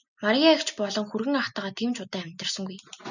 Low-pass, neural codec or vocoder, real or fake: 7.2 kHz; none; real